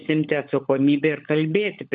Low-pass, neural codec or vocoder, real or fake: 7.2 kHz; codec, 16 kHz, 16 kbps, FunCodec, trained on LibriTTS, 50 frames a second; fake